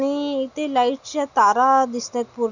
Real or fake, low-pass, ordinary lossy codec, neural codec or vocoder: real; 7.2 kHz; none; none